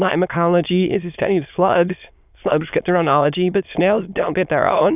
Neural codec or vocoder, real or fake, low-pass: autoencoder, 22.05 kHz, a latent of 192 numbers a frame, VITS, trained on many speakers; fake; 3.6 kHz